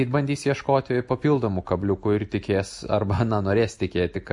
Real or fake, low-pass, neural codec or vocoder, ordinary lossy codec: real; 10.8 kHz; none; MP3, 48 kbps